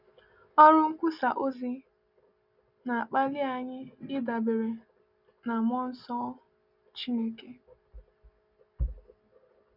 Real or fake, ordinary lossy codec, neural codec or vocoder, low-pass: real; none; none; 5.4 kHz